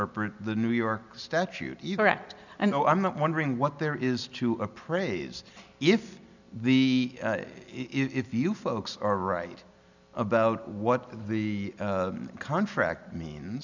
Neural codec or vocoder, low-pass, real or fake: none; 7.2 kHz; real